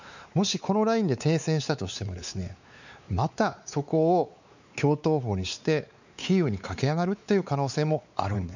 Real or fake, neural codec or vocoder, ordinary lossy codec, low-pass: fake; codec, 16 kHz, 4 kbps, X-Codec, WavLM features, trained on Multilingual LibriSpeech; none; 7.2 kHz